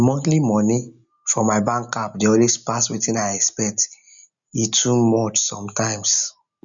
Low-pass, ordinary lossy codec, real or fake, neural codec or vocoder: 7.2 kHz; none; real; none